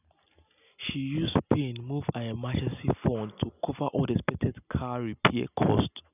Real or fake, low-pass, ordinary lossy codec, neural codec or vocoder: real; 3.6 kHz; none; none